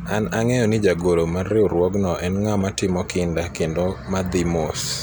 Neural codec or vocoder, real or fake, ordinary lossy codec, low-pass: none; real; none; none